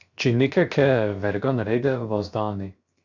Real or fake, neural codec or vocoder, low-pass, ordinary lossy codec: fake; codec, 16 kHz, 0.7 kbps, FocalCodec; 7.2 kHz; Opus, 64 kbps